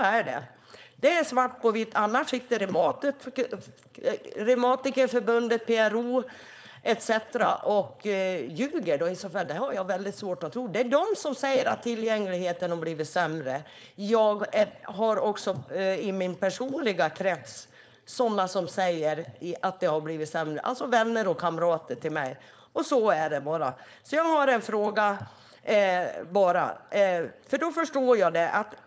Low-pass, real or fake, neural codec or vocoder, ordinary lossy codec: none; fake; codec, 16 kHz, 4.8 kbps, FACodec; none